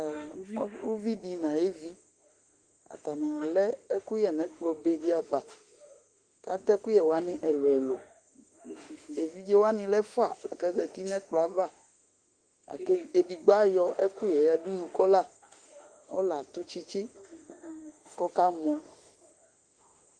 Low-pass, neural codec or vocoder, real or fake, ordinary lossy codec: 9.9 kHz; autoencoder, 48 kHz, 32 numbers a frame, DAC-VAE, trained on Japanese speech; fake; Opus, 24 kbps